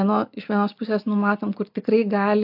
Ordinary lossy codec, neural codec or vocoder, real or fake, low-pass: AAC, 48 kbps; none; real; 5.4 kHz